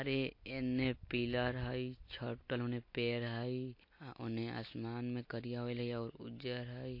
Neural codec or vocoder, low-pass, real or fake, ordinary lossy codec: none; 5.4 kHz; real; MP3, 32 kbps